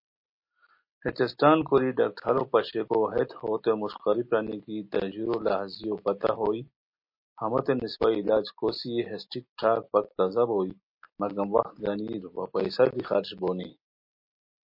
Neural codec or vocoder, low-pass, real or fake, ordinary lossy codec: none; 5.4 kHz; real; MP3, 32 kbps